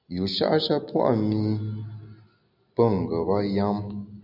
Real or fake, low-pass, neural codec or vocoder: real; 5.4 kHz; none